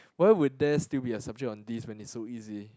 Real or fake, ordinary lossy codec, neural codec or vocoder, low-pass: real; none; none; none